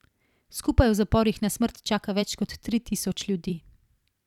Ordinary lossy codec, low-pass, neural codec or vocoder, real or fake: none; 19.8 kHz; vocoder, 44.1 kHz, 128 mel bands every 256 samples, BigVGAN v2; fake